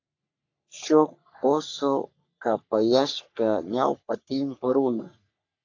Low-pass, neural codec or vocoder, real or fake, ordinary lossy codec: 7.2 kHz; codec, 44.1 kHz, 3.4 kbps, Pupu-Codec; fake; AAC, 48 kbps